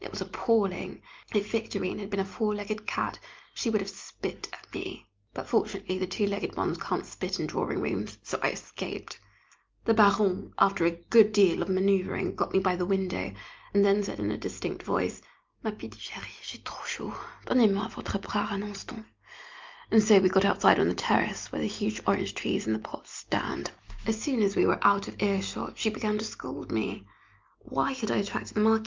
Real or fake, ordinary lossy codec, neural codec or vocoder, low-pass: real; Opus, 24 kbps; none; 7.2 kHz